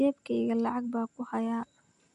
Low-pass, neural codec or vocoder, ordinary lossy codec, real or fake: 10.8 kHz; none; none; real